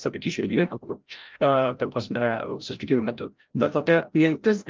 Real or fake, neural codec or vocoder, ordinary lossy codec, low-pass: fake; codec, 16 kHz, 0.5 kbps, FreqCodec, larger model; Opus, 32 kbps; 7.2 kHz